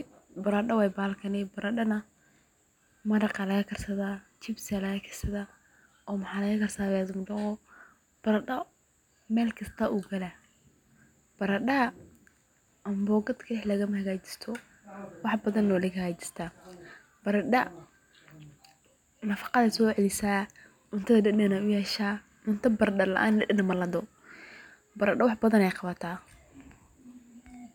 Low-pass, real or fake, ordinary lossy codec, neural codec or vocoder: 19.8 kHz; real; none; none